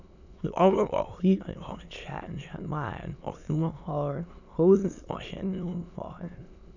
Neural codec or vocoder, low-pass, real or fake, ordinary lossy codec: autoencoder, 22.05 kHz, a latent of 192 numbers a frame, VITS, trained on many speakers; 7.2 kHz; fake; none